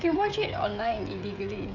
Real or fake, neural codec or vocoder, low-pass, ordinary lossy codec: fake; codec, 16 kHz, 16 kbps, FreqCodec, smaller model; 7.2 kHz; none